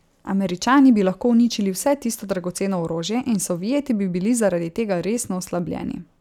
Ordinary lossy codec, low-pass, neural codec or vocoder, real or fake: none; 19.8 kHz; none; real